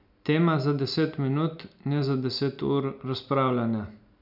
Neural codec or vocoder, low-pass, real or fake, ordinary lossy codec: none; 5.4 kHz; real; none